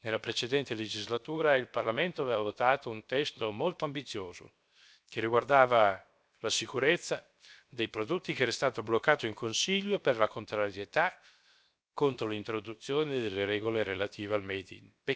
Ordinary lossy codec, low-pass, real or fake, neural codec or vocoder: none; none; fake; codec, 16 kHz, 0.7 kbps, FocalCodec